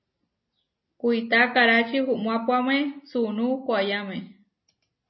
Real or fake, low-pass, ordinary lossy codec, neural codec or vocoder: real; 7.2 kHz; MP3, 24 kbps; none